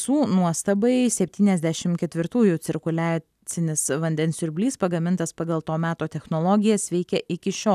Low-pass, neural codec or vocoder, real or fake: 14.4 kHz; none; real